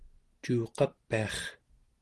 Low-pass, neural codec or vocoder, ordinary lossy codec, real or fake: 10.8 kHz; none; Opus, 16 kbps; real